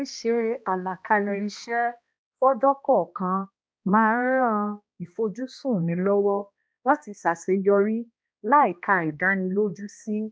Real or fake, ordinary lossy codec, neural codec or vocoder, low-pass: fake; none; codec, 16 kHz, 1 kbps, X-Codec, HuBERT features, trained on balanced general audio; none